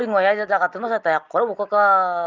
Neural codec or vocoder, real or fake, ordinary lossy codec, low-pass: none; real; Opus, 32 kbps; 7.2 kHz